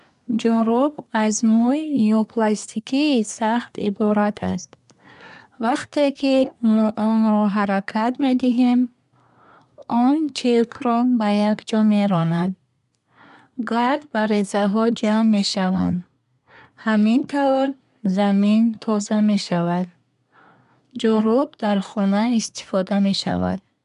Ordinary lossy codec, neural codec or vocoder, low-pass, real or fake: none; codec, 24 kHz, 1 kbps, SNAC; 10.8 kHz; fake